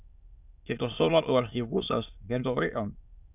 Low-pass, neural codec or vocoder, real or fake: 3.6 kHz; autoencoder, 22.05 kHz, a latent of 192 numbers a frame, VITS, trained on many speakers; fake